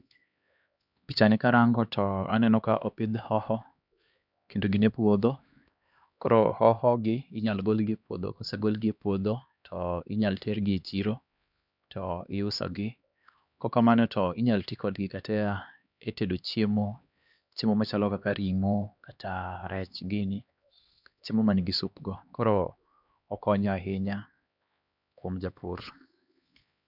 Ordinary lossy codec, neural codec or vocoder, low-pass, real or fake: none; codec, 16 kHz, 2 kbps, X-Codec, HuBERT features, trained on LibriSpeech; 5.4 kHz; fake